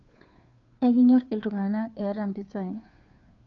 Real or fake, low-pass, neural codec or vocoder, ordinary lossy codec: fake; 7.2 kHz; codec, 16 kHz, 2 kbps, FunCodec, trained on Chinese and English, 25 frames a second; none